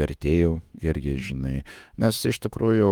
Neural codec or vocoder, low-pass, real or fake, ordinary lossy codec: autoencoder, 48 kHz, 32 numbers a frame, DAC-VAE, trained on Japanese speech; 19.8 kHz; fake; Opus, 32 kbps